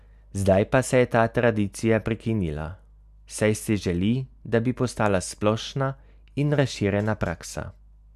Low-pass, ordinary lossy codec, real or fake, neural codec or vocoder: 14.4 kHz; none; real; none